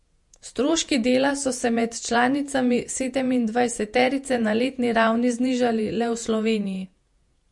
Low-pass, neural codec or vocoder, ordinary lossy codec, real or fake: 10.8 kHz; vocoder, 48 kHz, 128 mel bands, Vocos; MP3, 48 kbps; fake